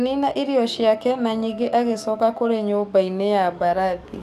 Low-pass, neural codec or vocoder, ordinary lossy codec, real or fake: 14.4 kHz; codec, 44.1 kHz, 7.8 kbps, DAC; none; fake